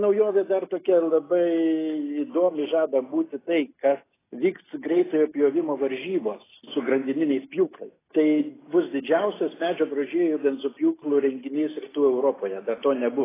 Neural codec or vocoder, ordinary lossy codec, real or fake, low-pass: none; AAC, 16 kbps; real; 3.6 kHz